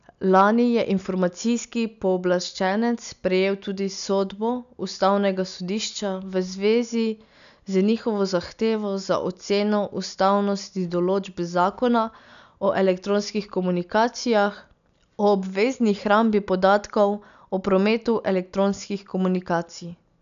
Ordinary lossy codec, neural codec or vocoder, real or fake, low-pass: none; none; real; 7.2 kHz